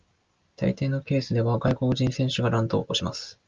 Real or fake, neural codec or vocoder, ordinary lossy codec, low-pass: real; none; Opus, 24 kbps; 7.2 kHz